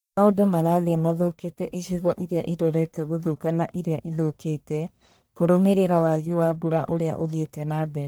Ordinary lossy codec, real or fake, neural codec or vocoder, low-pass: none; fake; codec, 44.1 kHz, 1.7 kbps, Pupu-Codec; none